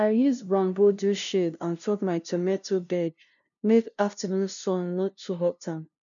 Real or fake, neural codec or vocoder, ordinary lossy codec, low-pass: fake; codec, 16 kHz, 0.5 kbps, FunCodec, trained on LibriTTS, 25 frames a second; none; 7.2 kHz